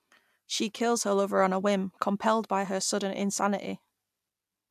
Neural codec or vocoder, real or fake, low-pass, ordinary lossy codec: none; real; 14.4 kHz; AAC, 96 kbps